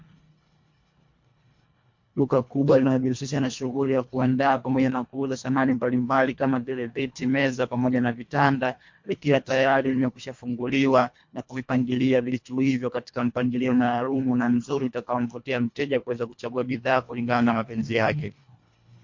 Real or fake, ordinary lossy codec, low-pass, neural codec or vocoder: fake; MP3, 48 kbps; 7.2 kHz; codec, 24 kHz, 1.5 kbps, HILCodec